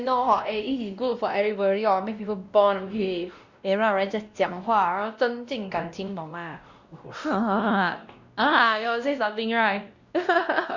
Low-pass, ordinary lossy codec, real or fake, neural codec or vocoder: 7.2 kHz; Opus, 64 kbps; fake; codec, 16 kHz, 1 kbps, X-Codec, WavLM features, trained on Multilingual LibriSpeech